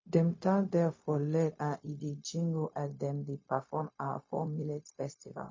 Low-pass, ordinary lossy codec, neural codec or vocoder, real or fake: 7.2 kHz; MP3, 32 kbps; codec, 16 kHz, 0.4 kbps, LongCat-Audio-Codec; fake